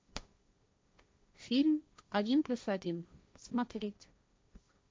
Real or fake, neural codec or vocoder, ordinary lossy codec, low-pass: fake; codec, 16 kHz, 1.1 kbps, Voila-Tokenizer; none; none